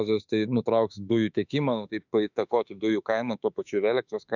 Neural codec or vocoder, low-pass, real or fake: autoencoder, 48 kHz, 32 numbers a frame, DAC-VAE, trained on Japanese speech; 7.2 kHz; fake